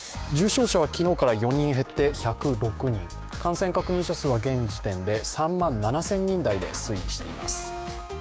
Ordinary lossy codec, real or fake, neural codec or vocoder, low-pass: none; fake; codec, 16 kHz, 6 kbps, DAC; none